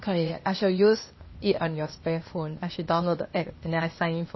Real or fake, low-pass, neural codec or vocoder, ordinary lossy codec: fake; 7.2 kHz; codec, 16 kHz, 0.8 kbps, ZipCodec; MP3, 24 kbps